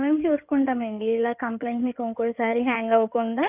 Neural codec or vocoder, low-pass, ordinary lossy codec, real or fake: codec, 16 kHz in and 24 kHz out, 2.2 kbps, FireRedTTS-2 codec; 3.6 kHz; none; fake